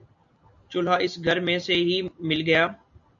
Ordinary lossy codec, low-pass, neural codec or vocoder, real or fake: MP3, 64 kbps; 7.2 kHz; none; real